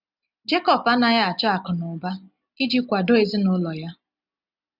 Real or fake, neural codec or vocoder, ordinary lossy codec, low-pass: real; none; none; 5.4 kHz